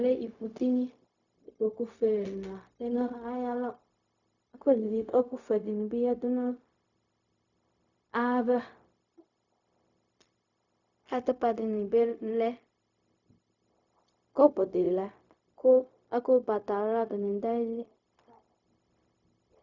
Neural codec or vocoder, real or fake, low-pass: codec, 16 kHz, 0.4 kbps, LongCat-Audio-Codec; fake; 7.2 kHz